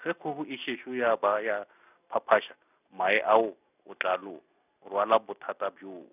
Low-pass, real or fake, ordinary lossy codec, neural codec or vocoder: 3.6 kHz; real; none; none